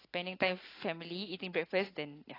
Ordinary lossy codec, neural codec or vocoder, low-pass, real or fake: AAC, 24 kbps; none; 5.4 kHz; real